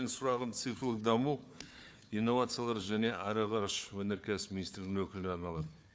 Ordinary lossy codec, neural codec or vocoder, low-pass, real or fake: none; codec, 16 kHz, 4 kbps, FunCodec, trained on Chinese and English, 50 frames a second; none; fake